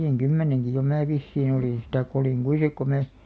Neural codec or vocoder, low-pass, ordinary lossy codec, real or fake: none; none; none; real